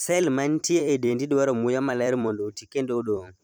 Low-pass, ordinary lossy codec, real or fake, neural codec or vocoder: none; none; fake; vocoder, 44.1 kHz, 128 mel bands every 256 samples, BigVGAN v2